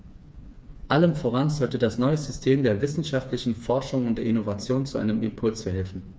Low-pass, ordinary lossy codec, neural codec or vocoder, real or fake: none; none; codec, 16 kHz, 4 kbps, FreqCodec, smaller model; fake